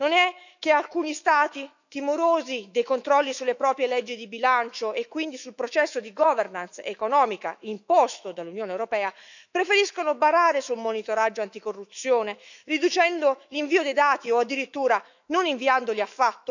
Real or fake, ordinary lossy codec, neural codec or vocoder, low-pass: fake; none; autoencoder, 48 kHz, 128 numbers a frame, DAC-VAE, trained on Japanese speech; 7.2 kHz